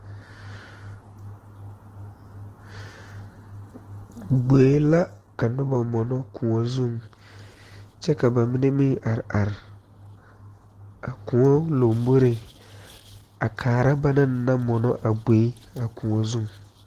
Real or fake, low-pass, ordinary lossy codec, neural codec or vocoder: real; 14.4 kHz; Opus, 16 kbps; none